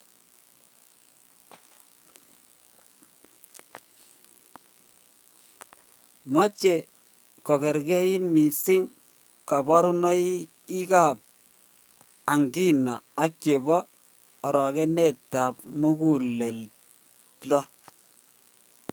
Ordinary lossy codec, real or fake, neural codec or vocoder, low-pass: none; fake; codec, 44.1 kHz, 2.6 kbps, SNAC; none